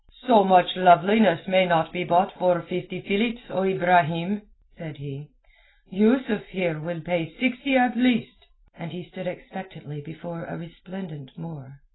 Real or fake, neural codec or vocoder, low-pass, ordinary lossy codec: real; none; 7.2 kHz; AAC, 16 kbps